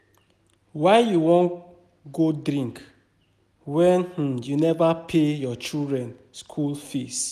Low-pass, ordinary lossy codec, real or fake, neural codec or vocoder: 14.4 kHz; none; real; none